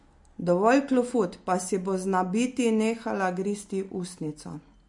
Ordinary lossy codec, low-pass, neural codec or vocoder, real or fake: MP3, 48 kbps; 10.8 kHz; none; real